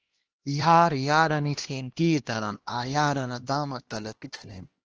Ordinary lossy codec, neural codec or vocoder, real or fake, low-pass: Opus, 16 kbps; codec, 16 kHz, 1 kbps, X-Codec, HuBERT features, trained on LibriSpeech; fake; 7.2 kHz